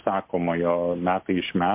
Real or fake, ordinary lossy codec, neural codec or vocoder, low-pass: real; MP3, 32 kbps; none; 3.6 kHz